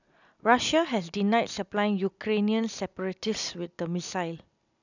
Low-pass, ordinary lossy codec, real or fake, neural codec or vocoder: 7.2 kHz; none; real; none